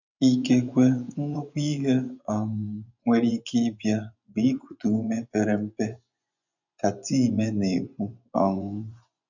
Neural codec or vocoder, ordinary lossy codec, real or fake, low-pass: vocoder, 24 kHz, 100 mel bands, Vocos; none; fake; 7.2 kHz